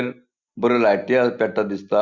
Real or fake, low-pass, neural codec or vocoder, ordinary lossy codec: real; 7.2 kHz; none; Opus, 64 kbps